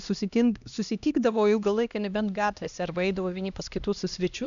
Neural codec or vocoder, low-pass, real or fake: codec, 16 kHz, 1 kbps, X-Codec, HuBERT features, trained on LibriSpeech; 7.2 kHz; fake